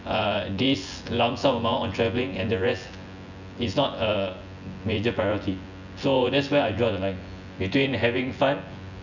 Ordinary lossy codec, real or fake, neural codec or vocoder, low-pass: none; fake; vocoder, 24 kHz, 100 mel bands, Vocos; 7.2 kHz